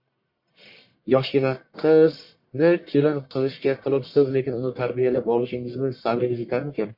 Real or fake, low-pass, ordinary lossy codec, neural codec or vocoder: fake; 5.4 kHz; MP3, 32 kbps; codec, 44.1 kHz, 1.7 kbps, Pupu-Codec